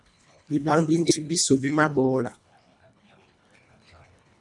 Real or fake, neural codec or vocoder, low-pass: fake; codec, 24 kHz, 1.5 kbps, HILCodec; 10.8 kHz